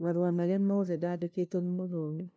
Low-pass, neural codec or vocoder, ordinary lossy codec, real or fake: none; codec, 16 kHz, 0.5 kbps, FunCodec, trained on LibriTTS, 25 frames a second; none; fake